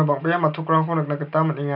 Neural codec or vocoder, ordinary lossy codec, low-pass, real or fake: none; none; 5.4 kHz; real